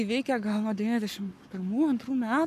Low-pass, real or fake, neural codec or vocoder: 14.4 kHz; fake; codec, 44.1 kHz, 7.8 kbps, Pupu-Codec